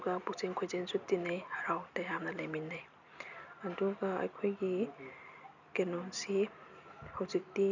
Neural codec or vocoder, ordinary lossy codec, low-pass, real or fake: none; none; 7.2 kHz; real